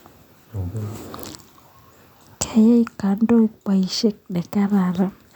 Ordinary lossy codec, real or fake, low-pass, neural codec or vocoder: none; real; 19.8 kHz; none